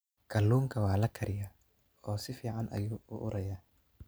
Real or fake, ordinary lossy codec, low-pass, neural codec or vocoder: real; none; none; none